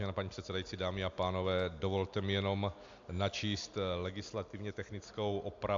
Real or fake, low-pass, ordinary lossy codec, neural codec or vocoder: real; 7.2 kHz; AAC, 64 kbps; none